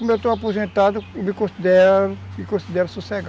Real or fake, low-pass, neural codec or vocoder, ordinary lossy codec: real; none; none; none